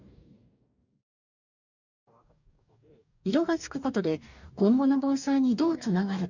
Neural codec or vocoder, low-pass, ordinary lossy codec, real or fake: codec, 44.1 kHz, 2.6 kbps, DAC; 7.2 kHz; none; fake